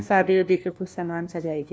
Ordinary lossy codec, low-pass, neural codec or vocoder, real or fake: none; none; codec, 16 kHz, 1 kbps, FunCodec, trained on LibriTTS, 50 frames a second; fake